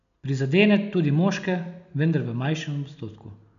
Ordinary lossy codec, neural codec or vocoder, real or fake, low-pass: none; none; real; 7.2 kHz